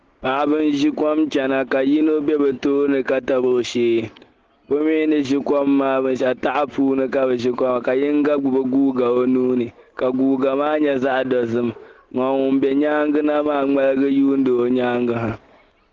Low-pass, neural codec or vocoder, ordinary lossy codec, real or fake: 7.2 kHz; none; Opus, 16 kbps; real